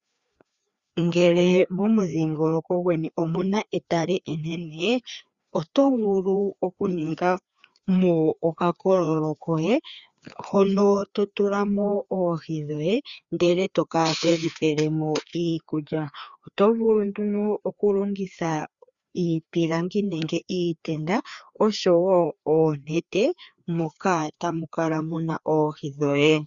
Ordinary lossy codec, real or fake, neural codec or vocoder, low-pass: Opus, 64 kbps; fake; codec, 16 kHz, 2 kbps, FreqCodec, larger model; 7.2 kHz